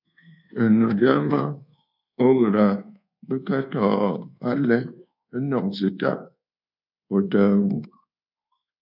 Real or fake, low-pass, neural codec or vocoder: fake; 5.4 kHz; codec, 24 kHz, 1.2 kbps, DualCodec